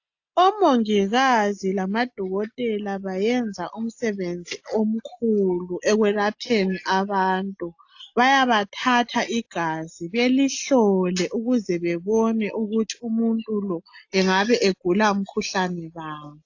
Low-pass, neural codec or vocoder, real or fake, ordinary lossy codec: 7.2 kHz; none; real; AAC, 48 kbps